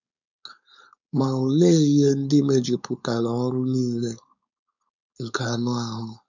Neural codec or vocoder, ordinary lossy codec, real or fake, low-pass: codec, 16 kHz, 4.8 kbps, FACodec; none; fake; 7.2 kHz